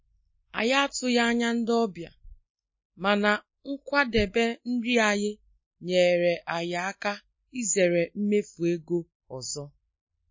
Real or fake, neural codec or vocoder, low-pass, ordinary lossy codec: real; none; 7.2 kHz; MP3, 32 kbps